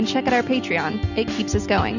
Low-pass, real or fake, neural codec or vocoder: 7.2 kHz; real; none